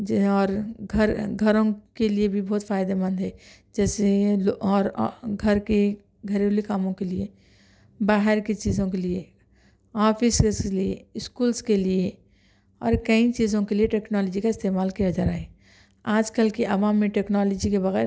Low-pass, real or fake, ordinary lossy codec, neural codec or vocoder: none; real; none; none